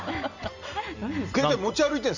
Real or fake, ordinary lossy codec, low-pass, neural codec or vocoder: real; none; 7.2 kHz; none